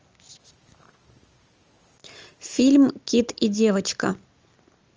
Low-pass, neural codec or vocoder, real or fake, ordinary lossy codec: 7.2 kHz; none; real; Opus, 24 kbps